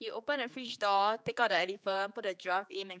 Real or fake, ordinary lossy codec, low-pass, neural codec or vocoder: fake; none; none; codec, 16 kHz, 4 kbps, X-Codec, HuBERT features, trained on general audio